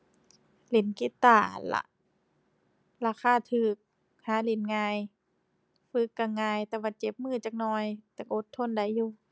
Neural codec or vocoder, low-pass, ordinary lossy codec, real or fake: none; none; none; real